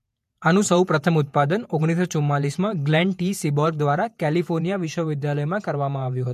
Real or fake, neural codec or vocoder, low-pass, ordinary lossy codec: fake; vocoder, 48 kHz, 128 mel bands, Vocos; 14.4 kHz; MP3, 64 kbps